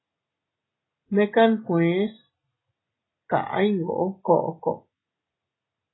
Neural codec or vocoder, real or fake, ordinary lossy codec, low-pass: none; real; AAC, 16 kbps; 7.2 kHz